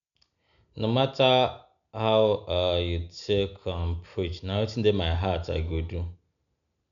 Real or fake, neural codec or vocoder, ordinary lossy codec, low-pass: real; none; MP3, 96 kbps; 7.2 kHz